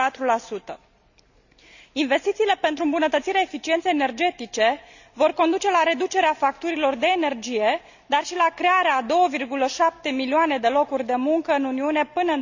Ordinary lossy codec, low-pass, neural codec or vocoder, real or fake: none; 7.2 kHz; none; real